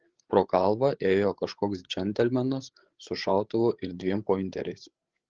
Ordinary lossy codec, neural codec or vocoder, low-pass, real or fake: Opus, 16 kbps; codec, 16 kHz, 8 kbps, FreqCodec, larger model; 7.2 kHz; fake